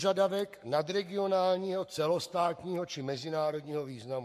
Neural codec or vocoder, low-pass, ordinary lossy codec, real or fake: codec, 44.1 kHz, 7.8 kbps, Pupu-Codec; 14.4 kHz; MP3, 64 kbps; fake